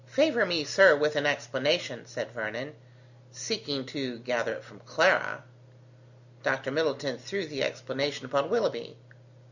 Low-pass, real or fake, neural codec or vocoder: 7.2 kHz; real; none